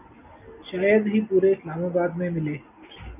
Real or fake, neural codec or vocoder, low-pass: real; none; 3.6 kHz